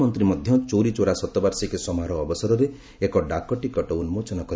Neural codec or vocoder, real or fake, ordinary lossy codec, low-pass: none; real; none; none